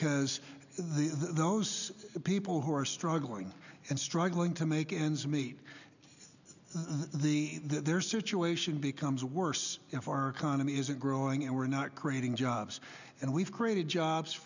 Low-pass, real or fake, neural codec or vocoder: 7.2 kHz; real; none